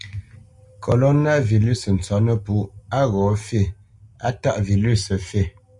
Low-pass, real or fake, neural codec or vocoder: 10.8 kHz; real; none